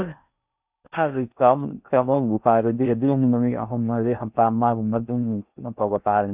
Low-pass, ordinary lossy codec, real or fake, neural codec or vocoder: 3.6 kHz; none; fake; codec, 16 kHz in and 24 kHz out, 0.6 kbps, FocalCodec, streaming, 4096 codes